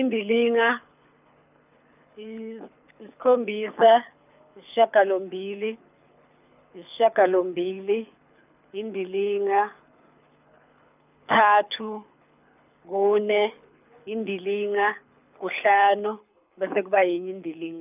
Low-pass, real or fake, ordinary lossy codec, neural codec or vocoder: 3.6 kHz; fake; none; codec, 24 kHz, 6 kbps, HILCodec